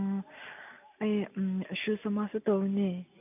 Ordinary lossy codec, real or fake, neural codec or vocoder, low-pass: none; real; none; 3.6 kHz